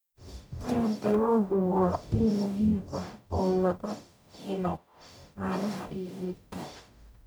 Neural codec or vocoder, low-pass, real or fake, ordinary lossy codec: codec, 44.1 kHz, 0.9 kbps, DAC; none; fake; none